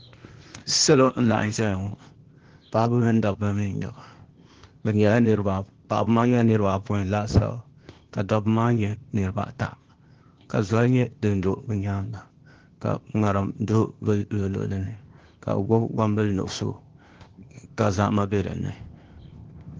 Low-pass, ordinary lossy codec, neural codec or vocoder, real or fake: 7.2 kHz; Opus, 16 kbps; codec, 16 kHz, 0.8 kbps, ZipCodec; fake